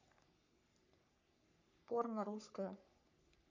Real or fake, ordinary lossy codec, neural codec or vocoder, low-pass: fake; none; codec, 44.1 kHz, 3.4 kbps, Pupu-Codec; 7.2 kHz